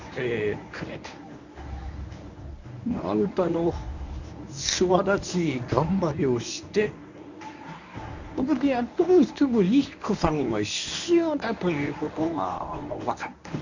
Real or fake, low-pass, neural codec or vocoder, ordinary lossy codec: fake; 7.2 kHz; codec, 24 kHz, 0.9 kbps, WavTokenizer, medium speech release version 1; none